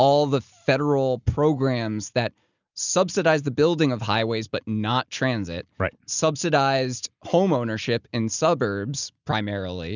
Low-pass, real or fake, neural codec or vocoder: 7.2 kHz; real; none